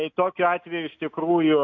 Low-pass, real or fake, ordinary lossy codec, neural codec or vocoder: 7.2 kHz; real; MP3, 48 kbps; none